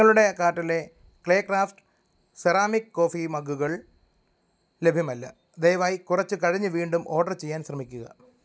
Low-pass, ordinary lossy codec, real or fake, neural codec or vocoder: none; none; real; none